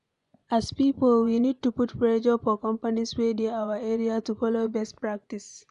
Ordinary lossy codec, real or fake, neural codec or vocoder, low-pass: none; fake; vocoder, 24 kHz, 100 mel bands, Vocos; 10.8 kHz